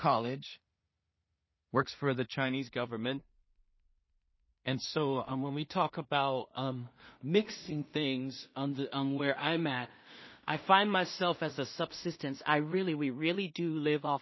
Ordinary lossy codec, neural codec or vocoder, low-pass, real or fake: MP3, 24 kbps; codec, 16 kHz in and 24 kHz out, 0.4 kbps, LongCat-Audio-Codec, two codebook decoder; 7.2 kHz; fake